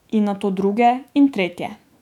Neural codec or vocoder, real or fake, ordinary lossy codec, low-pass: autoencoder, 48 kHz, 128 numbers a frame, DAC-VAE, trained on Japanese speech; fake; none; 19.8 kHz